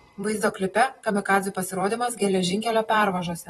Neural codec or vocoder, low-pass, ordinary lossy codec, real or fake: vocoder, 44.1 kHz, 128 mel bands every 512 samples, BigVGAN v2; 19.8 kHz; AAC, 32 kbps; fake